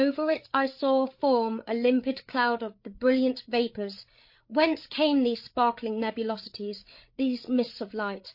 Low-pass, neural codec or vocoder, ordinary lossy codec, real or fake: 5.4 kHz; codec, 16 kHz, 8 kbps, FreqCodec, larger model; MP3, 32 kbps; fake